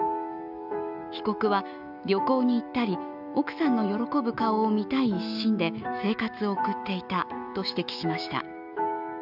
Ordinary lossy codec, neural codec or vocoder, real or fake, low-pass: none; autoencoder, 48 kHz, 128 numbers a frame, DAC-VAE, trained on Japanese speech; fake; 5.4 kHz